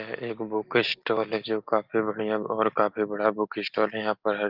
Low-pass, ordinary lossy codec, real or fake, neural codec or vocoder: 5.4 kHz; Opus, 32 kbps; real; none